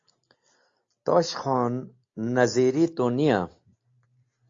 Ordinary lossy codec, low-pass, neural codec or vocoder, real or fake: AAC, 48 kbps; 7.2 kHz; none; real